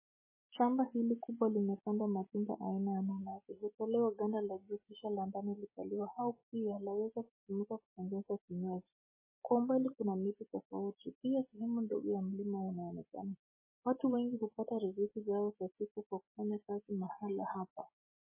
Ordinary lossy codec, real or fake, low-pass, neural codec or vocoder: MP3, 16 kbps; real; 3.6 kHz; none